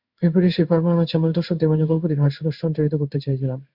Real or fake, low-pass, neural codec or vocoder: fake; 5.4 kHz; codec, 16 kHz in and 24 kHz out, 1 kbps, XY-Tokenizer